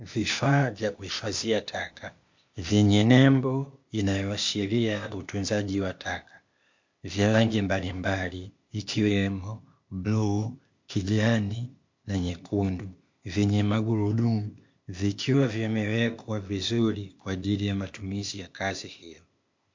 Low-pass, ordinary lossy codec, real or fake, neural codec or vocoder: 7.2 kHz; MP3, 48 kbps; fake; codec, 16 kHz, 0.8 kbps, ZipCodec